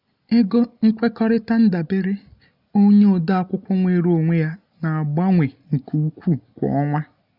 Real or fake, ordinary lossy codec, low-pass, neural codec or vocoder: real; none; 5.4 kHz; none